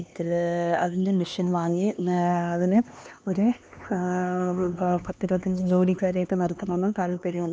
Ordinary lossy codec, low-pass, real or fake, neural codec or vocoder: none; none; fake; codec, 16 kHz, 2 kbps, X-Codec, HuBERT features, trained on LibriSpeech